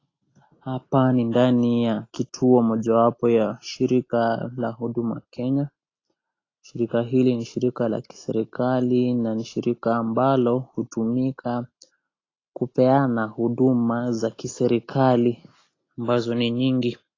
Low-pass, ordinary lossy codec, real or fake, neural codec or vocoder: 7.2 kHz; AAC, 32 kbps; real; none